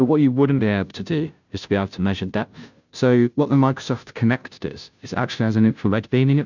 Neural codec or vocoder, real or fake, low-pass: codec, 16 kHz, 0.5 kbps, FunCodec, trained on Chinese and English, 25 frames a second; fake; 7.2 kHz